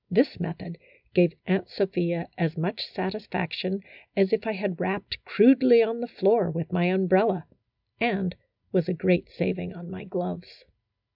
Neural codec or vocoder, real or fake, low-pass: none; real; 5.4 kHz